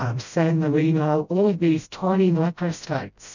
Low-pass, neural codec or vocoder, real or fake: 7.2 kHz; codec, 16 kHz, 0.5 kbps, FreqCodec, smaller model; fake